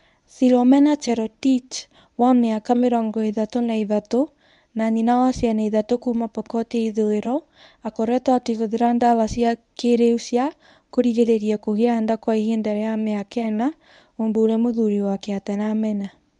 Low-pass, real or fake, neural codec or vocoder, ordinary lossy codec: 10.8 kHz; fake; codec, 24 kHz, 0.9 kbps, WavTokenizer, medium speech release version 1; none